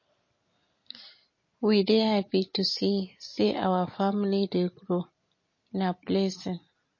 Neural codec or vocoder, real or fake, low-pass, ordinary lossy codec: none; real; 7.2 kHz; MP3, 32 kbps